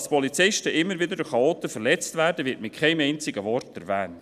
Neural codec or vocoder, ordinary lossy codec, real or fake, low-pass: none; none; real; 14.4 kHz